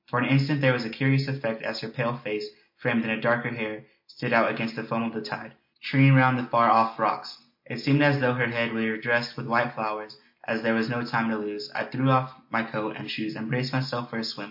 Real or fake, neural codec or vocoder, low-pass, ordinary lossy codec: real; none; 5.4 kHz; MP3, 32 kbps